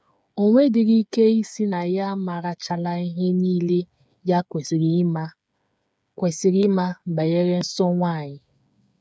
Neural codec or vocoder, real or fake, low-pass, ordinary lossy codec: codec, 16 kHz, 8 kbps, FreqCodec, smaller model; fake; none; none